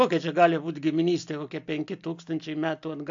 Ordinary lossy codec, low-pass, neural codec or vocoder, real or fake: AAC, 64 kbps; 7.2 kHz; none; real